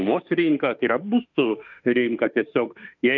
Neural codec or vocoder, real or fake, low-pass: autoencoder, 48 kHz, 32 numbers a frame, DAC-VAE, trained on Japanese speech; fake; 7.2 kHz